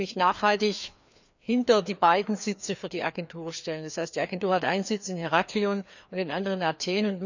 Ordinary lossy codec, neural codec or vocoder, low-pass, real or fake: none; codec, 16 kHz, 2 kbps, FreqCodec, larger model; 7.2 kHz; fake